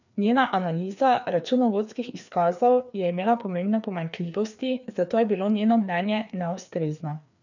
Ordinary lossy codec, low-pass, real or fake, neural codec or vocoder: none; 7.2 kHz; fake; codec, 16 kHz, 2 kbps, FreqCodec, larger model